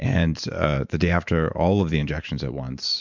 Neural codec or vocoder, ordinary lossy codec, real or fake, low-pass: vocoder, 44.1 kHz, 128 mel bands every 512 samples, BigVGAN v2; MP3, 64 kbps; fake; 7.2 kHz